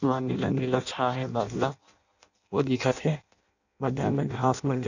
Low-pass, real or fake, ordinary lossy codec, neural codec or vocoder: 7.2 kHz; fake; none; codec, 16 kHz in and 24 kHz out, 0.6 kbps, FireRedTTS-2 codec